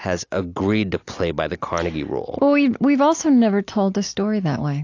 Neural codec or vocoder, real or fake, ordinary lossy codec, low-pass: none; real; AAC, 48 kbps; 7.2 kHz